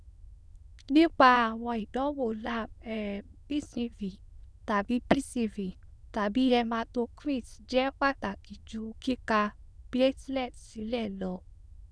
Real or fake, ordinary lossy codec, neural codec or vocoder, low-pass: fake; none; autoencoder, 22.05 kHz, a latent of 192 numbers a frame, VITS, trained on many speakers; none